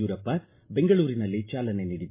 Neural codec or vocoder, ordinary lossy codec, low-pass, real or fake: none; MP3, 24 kbps; 3.6 kHz; real